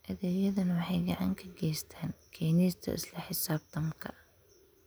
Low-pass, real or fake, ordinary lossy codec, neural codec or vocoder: none; real; none; none